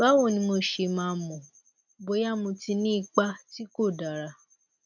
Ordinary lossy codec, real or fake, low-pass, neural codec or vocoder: none; real; 7.2 kHz; none